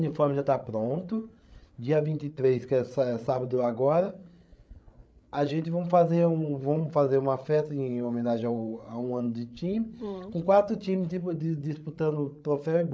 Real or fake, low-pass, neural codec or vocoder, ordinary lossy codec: fake; none; codec, 16 kHz, 8 kbps, FreqCodec, larger model; none